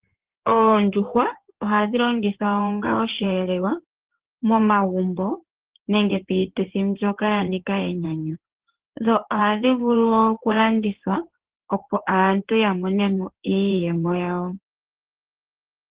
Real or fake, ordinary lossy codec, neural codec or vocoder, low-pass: fake; Opus, 16 kbps; codec, 16 kHz in and 24 kHz out, 2.2 kbps, FireRedTTS-2 codec; 3.6 kHz